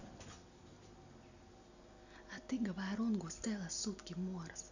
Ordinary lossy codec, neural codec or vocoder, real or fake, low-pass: none; none; real; 7.2 kHz